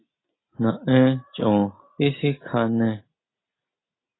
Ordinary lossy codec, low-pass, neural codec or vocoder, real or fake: AAC, 16 kbps; 7.2 kHz; none; real